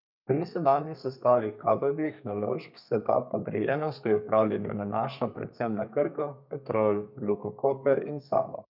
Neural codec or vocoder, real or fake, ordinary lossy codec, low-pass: codec, 32 kHz, 1.9 kbps, SNAC; fake; none; 5.4 kHz